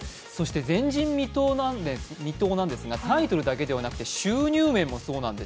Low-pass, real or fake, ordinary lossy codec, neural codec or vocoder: none; real; none; none